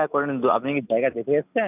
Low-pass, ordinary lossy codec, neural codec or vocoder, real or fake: 3.6 kHz; none; none; real